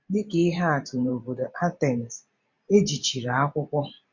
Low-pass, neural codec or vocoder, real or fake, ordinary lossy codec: 7.2 kHz; vocoder, 44.1 kHz, 128 mel bands every 512 samples, BigVGAN v2; fake; MP3, 48 kbps